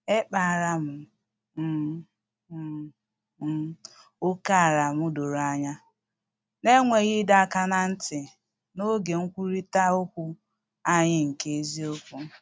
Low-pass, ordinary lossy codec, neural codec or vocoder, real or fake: none; none; none; real